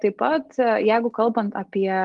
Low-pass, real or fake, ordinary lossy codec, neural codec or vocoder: 10.8 kHz; real; Opus, 24 kbps; none